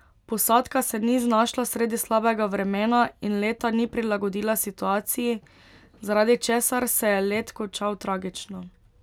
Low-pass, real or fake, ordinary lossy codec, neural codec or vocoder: 19.8 kHz; real; none; none